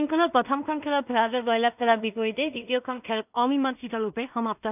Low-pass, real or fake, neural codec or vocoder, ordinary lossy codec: 3.6 kHz; fake; codec, 16 kHz in and 24 kHz out, 0.4 kbps, LongCat-Audio-Codec, two codebook decoder; none